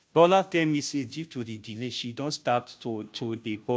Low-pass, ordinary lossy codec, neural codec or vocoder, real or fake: none; none; codec, 16 kHz, 0.5 kbps, FunCodec, trained on Chinese and English, 25 frames a second; fake